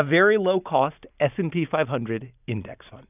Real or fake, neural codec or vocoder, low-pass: real; none; 3.6 kHz